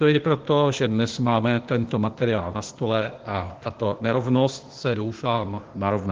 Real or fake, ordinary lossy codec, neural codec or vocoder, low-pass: fake; Opus, 16 kbps; codec, 16 kHz, 0.8 kbps, ZipCodec; 7.2 kHz